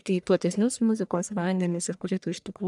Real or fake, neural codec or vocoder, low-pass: fake; codec, 44.1 kHz, 1.7 kbps, Pupu-Codec; 10.8 kHz